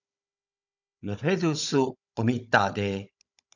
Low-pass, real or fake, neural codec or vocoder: 7.2 kHz; fake; codec, 16 kHz, 16 kbps, FunCodec, trained on Chinese and English, 50 frames a second